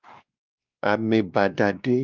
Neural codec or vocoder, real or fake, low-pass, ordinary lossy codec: codec, 16 kHz, 2 kbps, X-Codec, WavLM features, trained on Multilingual LibriSpeech; fake; 7.2 kHz; Opus, 32 kbps